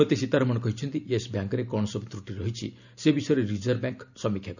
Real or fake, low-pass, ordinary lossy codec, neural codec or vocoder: real; 7.2 kHz; none; none